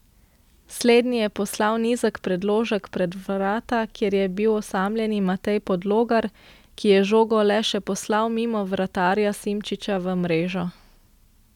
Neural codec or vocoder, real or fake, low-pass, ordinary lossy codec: none; real; 19.8 kHz; none